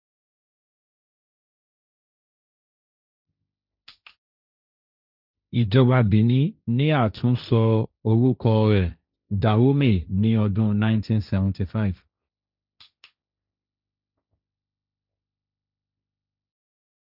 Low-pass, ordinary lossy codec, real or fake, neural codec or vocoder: 5.4 kHz; none; fake; codec, 16 kHz, 1.1 kbps, Voila-Tokenizer